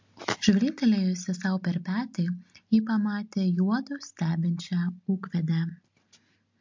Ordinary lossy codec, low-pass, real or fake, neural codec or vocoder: MP3, 48 kbps; 7.2 kHz; real; none